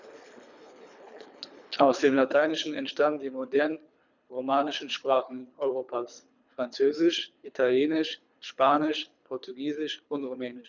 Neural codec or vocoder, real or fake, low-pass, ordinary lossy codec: codec, 24 kHz, 3 kbps, HILCodec; fake; 7.2 kHz; none